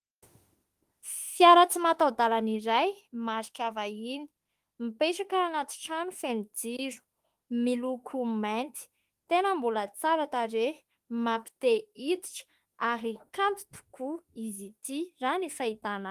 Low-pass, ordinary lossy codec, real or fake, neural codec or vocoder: 14.4 kHz; Opus, 24 kbps; fake; autoencoder, 48 kHz, 32 numbers a frame, DAC-VAE, trained on Japanese speech